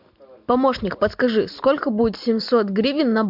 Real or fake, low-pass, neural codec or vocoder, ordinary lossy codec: real; 5.4 kHz; none; MP3, 48 kbps